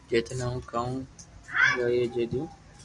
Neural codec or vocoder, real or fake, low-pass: none; real; 10.8 kHz